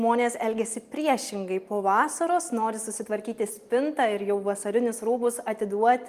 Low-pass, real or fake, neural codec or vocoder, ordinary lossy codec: 14.4 kHz; real; none; Opus, 32 kbps